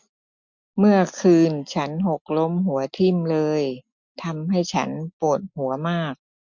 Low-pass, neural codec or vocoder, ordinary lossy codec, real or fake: 7.2 kHz; none; MP3, 64 kbps; real